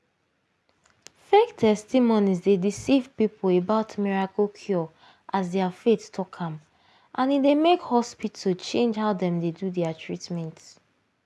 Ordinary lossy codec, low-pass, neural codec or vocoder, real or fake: none; none; none; real